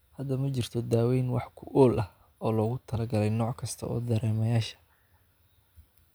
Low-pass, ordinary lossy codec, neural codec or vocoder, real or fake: none; none; none; real